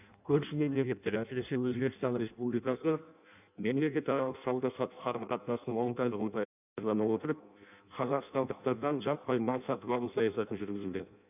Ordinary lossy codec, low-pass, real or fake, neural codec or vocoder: none; 3.6 kHz; fake; codec, 16 kHz in and 24 kHz out, 0.6 kbps, FireRedTTS-2 codec